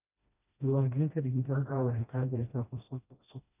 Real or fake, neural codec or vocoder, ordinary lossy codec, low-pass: fake; codec, 16 kHz, 1 kbps, FreqCodec, smaller model; none; 3.6 kHz